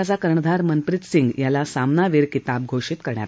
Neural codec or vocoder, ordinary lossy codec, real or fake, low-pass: none; none; real; none